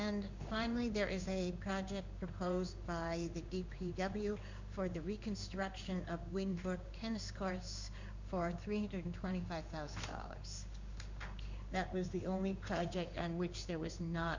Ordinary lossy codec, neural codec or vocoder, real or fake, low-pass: MP3, 64 kbps; codec, 16 kHz in and 24 kHz out, 1 kbps, XY-Tokenizer; fake; 7.2 kHz